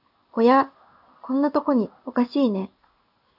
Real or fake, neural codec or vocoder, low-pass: fake; codec, 16 kHz in and 24 kHz out, 1 kbps, XY-Tokenizer; 5.4 kHz